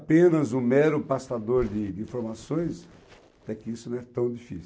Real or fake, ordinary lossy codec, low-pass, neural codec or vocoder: real; none; none; none